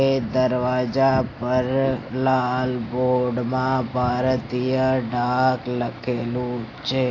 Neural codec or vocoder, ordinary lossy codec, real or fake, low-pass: none; AAC, 32 kbps; real; 7.2 kHz